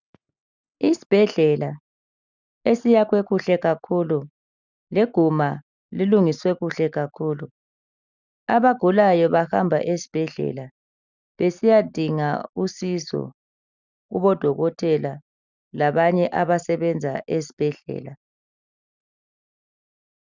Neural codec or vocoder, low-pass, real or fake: none; 7.2 kHz; real